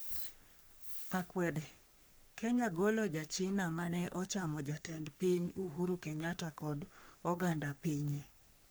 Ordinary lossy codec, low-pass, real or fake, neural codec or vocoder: none; none; fake; codec, 44.1 kHz, 3.4 kbps, Pupu-Codec